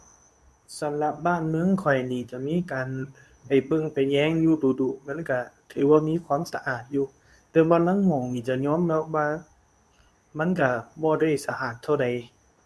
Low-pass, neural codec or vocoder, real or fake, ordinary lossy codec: none; codec, 24 kHz, 0.9 kbps, WavTokenizer, medium speech release version 2; fake; none